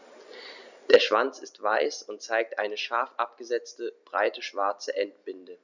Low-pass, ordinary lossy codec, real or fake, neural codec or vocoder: 7.2 kHz; none; real; none